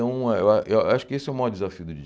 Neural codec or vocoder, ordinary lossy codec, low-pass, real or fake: none; none; none; real